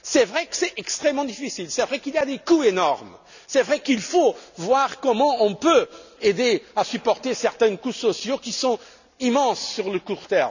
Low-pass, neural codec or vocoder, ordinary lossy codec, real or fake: 7.2 kHz; none; none; real